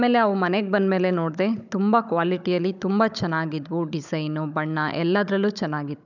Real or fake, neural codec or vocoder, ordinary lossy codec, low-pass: fake; codec, 16 kHz, 16 kbps, FunCodec, trained on Chinese and English, 50 frames a second; none; 7.2 kHz